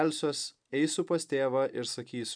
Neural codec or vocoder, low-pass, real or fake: none; 9.9 kHz; real